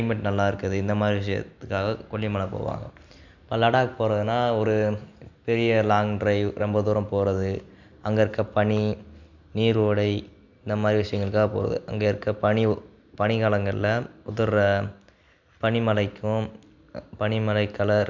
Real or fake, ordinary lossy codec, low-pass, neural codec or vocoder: real; none; 7.2 kHz; none